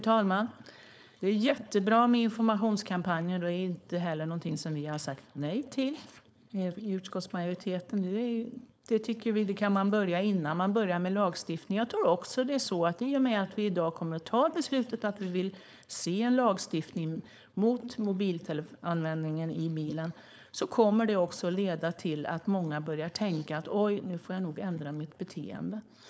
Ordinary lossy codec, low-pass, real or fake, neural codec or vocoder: none; none; fake; codec, 16 kHz, 4.8 kbps, FACodec